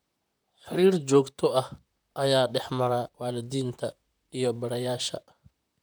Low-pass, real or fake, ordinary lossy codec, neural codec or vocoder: none; fake; none; vocoder, 44.1 kHz, 128 mel bands, Pupu-Vocoder